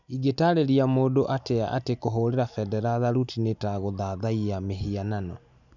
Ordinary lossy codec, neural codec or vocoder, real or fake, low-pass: none; none; real; 7.2 kHz